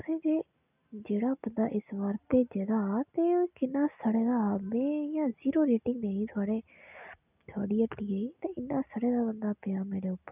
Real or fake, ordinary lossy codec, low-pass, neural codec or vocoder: real; none; 3.6 kHz; none